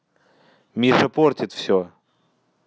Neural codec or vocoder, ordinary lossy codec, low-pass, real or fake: none; none; none; real